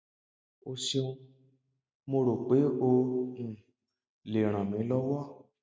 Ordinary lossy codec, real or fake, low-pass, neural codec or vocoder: none; real; none; none